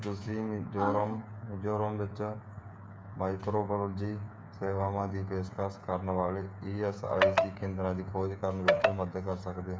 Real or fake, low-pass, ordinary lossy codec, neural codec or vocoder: fake; none; none; codec, 16 kHz, 8 kbps, FreqCodec, smaller model